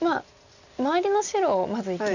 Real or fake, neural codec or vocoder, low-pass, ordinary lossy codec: real; none; 7.2 kHz; none